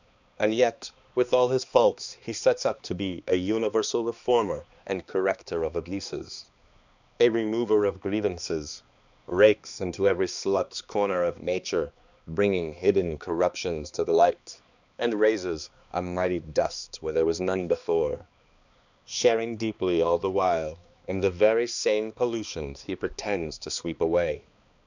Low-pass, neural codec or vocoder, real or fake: 7.2 kHz; codec, 16 kHz, 2 kbps, X-Codec, HuBERT features, trained on balanced general audio; fake